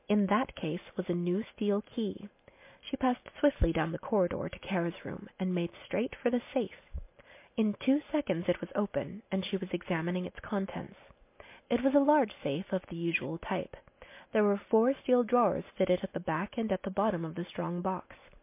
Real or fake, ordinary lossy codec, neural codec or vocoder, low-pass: real; MP3, 24 kbps; none; 3.6 kHz